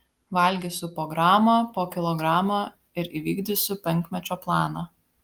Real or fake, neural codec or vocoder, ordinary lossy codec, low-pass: real; none; Opus, 32 kbps; 19.8 kHz